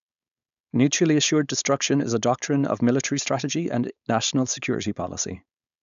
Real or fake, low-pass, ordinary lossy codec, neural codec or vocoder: fake; 7.2 kHz; MP3, 96 kbps; codec, 16 kHz, 4.8 kbps, FACodec